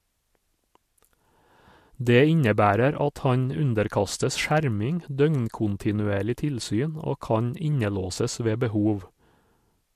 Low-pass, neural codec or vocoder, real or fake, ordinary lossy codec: 14.4 kHz; none; real; MP3, 64 kbps